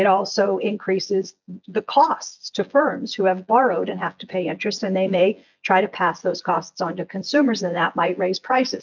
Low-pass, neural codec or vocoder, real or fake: 7.2 kHz; vocoder, 24 kHz, 100 mel bands, Vocos; fake